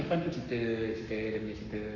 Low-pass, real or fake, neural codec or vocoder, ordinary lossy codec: 7.2 kHz; fake; codec, 44.1 kHz, 7.8 kbps, Pupu-Codec; none